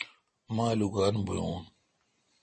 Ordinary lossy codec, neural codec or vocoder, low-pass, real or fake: MP3, 32 kbps; none; 10.8 kHz; real